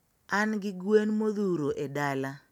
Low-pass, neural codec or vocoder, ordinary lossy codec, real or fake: 19.8 kHz; none; none; real